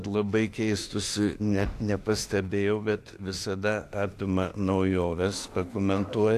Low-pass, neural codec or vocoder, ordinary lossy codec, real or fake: 14.4 kHz; autoencoder, 48 kHz, 32 numbers a frame, DAC-VAE, trained on Japanese speech; AAC, 64 kbps; fake